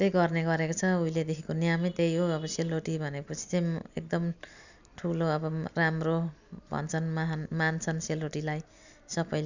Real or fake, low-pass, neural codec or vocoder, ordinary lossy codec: real; 7.2 kHz; none; none